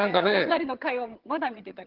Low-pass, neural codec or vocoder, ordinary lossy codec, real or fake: 5.4 kHz; vocoder, 22.05 kHz, 80 mel bands, HiFi-GAN; Opus, 16 kbps; fake